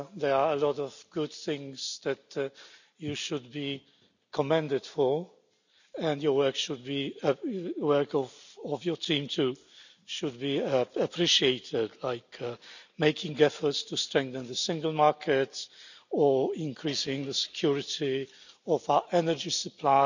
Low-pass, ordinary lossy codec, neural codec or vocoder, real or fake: 7.2 kHz; none; none; real